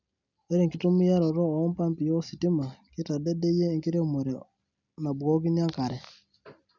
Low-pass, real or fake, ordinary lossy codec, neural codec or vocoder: 7.2 kHz; real; none; none